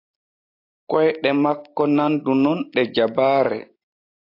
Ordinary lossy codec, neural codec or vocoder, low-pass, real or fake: AAC, 32 kbps; none; 5.4 kHz; real